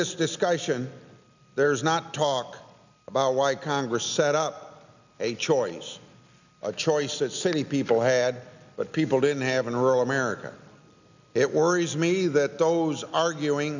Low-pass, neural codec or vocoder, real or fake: 7.2 kHz; none; real